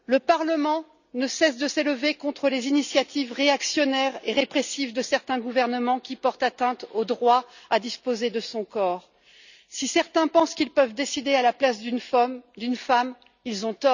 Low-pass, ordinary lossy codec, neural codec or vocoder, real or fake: 7.2 kHz; none; none; real